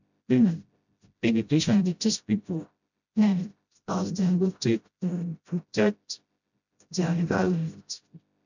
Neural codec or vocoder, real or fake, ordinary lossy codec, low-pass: codec, 16 kHz, 0.5 kbps, FreqCodec, smaller model; fake; none; 7.2 kHz